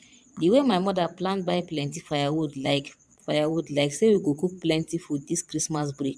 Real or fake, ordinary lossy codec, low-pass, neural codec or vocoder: fake; none; none; vocoder, 22.05 kHz, 80 mel bands, Vocos